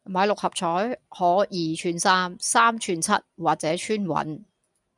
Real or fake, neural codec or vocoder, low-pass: real; none; 10.8 kHz